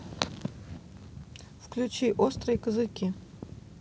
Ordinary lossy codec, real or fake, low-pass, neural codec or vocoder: none; real; none; none